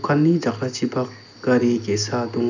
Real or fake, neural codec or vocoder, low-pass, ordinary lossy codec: real; none; 7.2 kHz; none